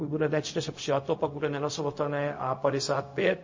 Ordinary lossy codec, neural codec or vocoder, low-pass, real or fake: MP3, 32 kbps; codec, 16 kHz, 0.4 kbps, LongCat-Audio-Codec; 7.2 kHz; fake